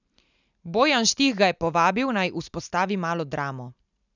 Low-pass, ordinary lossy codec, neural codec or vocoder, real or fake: 7.2 kHz; none; none; real